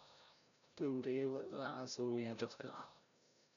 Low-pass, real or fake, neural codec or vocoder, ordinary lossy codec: 7.2 kHz; fake; codec, 16 kHz, 0.5 kbps, FreqCodec, larger model; none